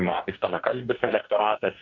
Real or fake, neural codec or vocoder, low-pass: fake; codec, 44.1 kHz, 2.6 kbps, DAC; 7.2 kHz